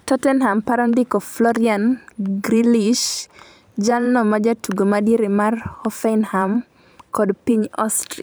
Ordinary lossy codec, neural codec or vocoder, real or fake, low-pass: none; vocoder, 44.1 kHz, 128 mel bands every 512 samples, BigVGAN v2; fake; none